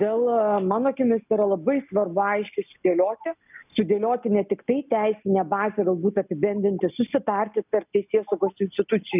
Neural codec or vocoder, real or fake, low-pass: none; real; 3.6 kHz